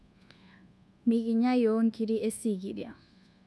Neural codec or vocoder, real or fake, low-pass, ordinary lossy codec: codec, 24 kHz, 1.2 kbps, DualCodec; fake; none; none